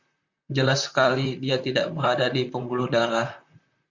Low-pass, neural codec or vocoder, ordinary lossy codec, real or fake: 7.2 kHz; vocoder, 44.1 kHz, 128 mel bands, Pupu-Vocoder; Opus, 64 kbps; fake